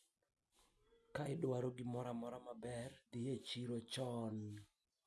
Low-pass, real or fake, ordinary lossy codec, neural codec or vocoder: none; real; none; none